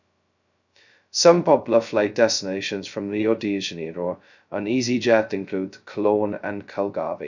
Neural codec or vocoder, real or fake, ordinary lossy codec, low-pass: codec, 16 kHz, 0.2 kbps, FocalCodec; fake; none; 7.2 kHz